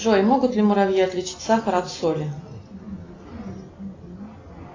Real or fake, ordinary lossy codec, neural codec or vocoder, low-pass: real; AAC, 32 kbps; none; 7.2 kHz